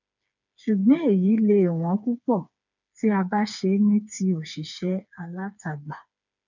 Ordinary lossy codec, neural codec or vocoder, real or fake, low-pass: none; codec, 16 kHz, 4 kbps, FreqCodec, smaller model; fake; 7.2 kHz